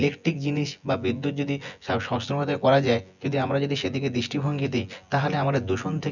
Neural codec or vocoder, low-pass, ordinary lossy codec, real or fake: vocoder, 24 kHz, 100 mel bands, Vocos; 7.2 kHz; none; fake